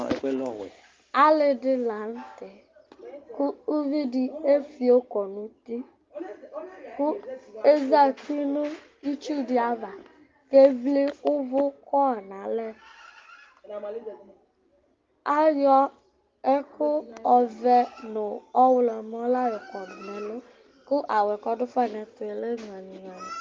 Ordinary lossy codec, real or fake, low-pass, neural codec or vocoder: Opus, 32 kbps; real; 7.2 kHz; none